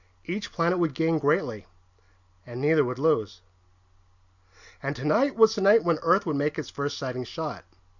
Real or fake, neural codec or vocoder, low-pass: real; none; 7.2 kHz